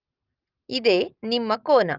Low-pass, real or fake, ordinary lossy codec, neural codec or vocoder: 5.4 kHz; real; Opus, 24 kbps; none